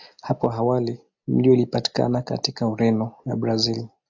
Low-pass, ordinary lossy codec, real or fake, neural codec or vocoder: 7.2 kHz; AAC, 48 kbps; real; none